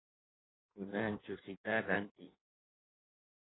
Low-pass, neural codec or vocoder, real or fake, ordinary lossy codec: 7.2 kHz; codec, 16 kHz in and 24 kHz out, 1.1 kbps, FireRedTTS-2 codec; fake; AAC, 16 kbps